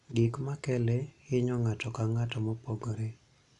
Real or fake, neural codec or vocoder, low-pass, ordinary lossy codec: real; none; 10.8 kHz; none